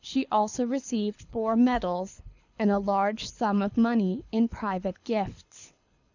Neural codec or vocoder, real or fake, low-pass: codec, 24 kHz, 6 kbps, HILCodec; fake; 7.2 kHz